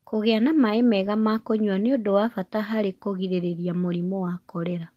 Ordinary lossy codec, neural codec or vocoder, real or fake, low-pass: Opus, 24 kbps; none; real; 14.4 kHz